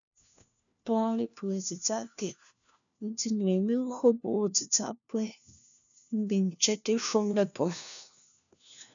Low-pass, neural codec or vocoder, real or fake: 7.2 kHz; codec, 16 kHz, 1 kbps, FunCodec, trained on LibriTTS, 50 frames a second; fake